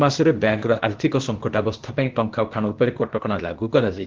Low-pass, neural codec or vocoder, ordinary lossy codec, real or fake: 7.2 kHz; codec, 16 kHz, 0.8 kbps, ZipCodec; Opus, 16 kbps; fake